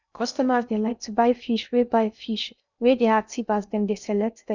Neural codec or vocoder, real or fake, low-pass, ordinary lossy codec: codec, 16 kHz in and 24 kHz out, 0.6 kbps, FocalCodec, streaming, 2048 codes; fake; 7.2 kHz; none